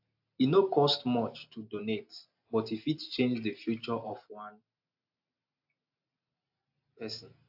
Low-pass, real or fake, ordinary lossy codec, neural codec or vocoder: 5.4 kHz; real; AAC, 48 kbps; none